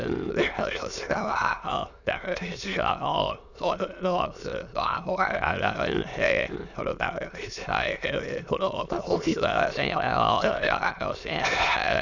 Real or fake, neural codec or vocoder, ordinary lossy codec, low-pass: fake; autoencoder, 22.05 kHz, a latent of 192 numbers a frame, VITS, trained on many speakers; none; 7.2 kHz